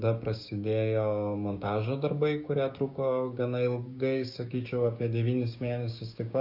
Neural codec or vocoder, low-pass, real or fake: codec, 16 kHz, 6 kbps, DAC; 5.4 kHz; fake